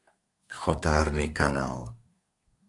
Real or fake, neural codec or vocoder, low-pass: fake; codec, 24 kHz, 1 kbps, SNAC; 10.8 kHz